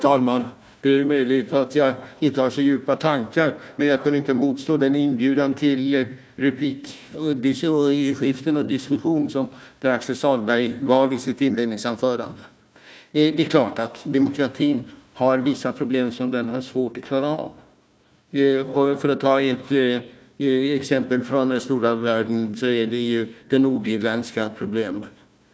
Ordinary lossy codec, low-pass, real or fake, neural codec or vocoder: none; none; fake; codec, 16 kHz, 1 kbps, FunCodec, trained on Chinese and English, 50 frames a second